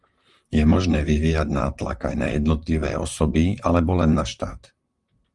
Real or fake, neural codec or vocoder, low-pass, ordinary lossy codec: fake; vocoder, 44.1 kHz, 128 mel bands, Pupu-Vocoder; 10.8 kHz; Opus, 32 kbps